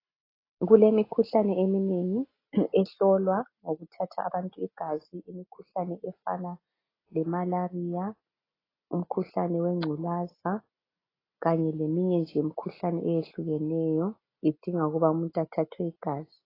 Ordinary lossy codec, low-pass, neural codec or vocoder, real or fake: AAC, 24 kbps; 5.4 kHz; none; real